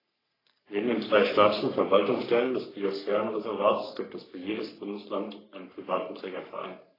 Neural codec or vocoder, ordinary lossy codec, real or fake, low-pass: codec, 44.1 kHz, 3.4 kbps, Pupu-Codec; AAC, 24 kbps; fake; 5.4 kHz